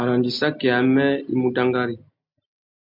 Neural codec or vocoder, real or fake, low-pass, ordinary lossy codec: none; real; 5.4 kHz; MP3, 48 kbps